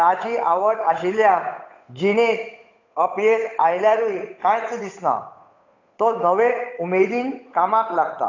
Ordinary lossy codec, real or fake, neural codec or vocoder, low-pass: AAC, 48 kbps; fake; codec, 16 kHz, 8 kbps, FunCodec, trained on Chinese and English, 25 frames a second; 7.2 kHz